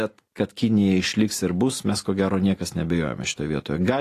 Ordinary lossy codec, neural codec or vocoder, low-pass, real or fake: AAC, 48 kbps; none; 14.4 kHz; real